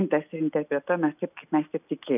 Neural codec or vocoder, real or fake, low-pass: none; real; 3.6 kHz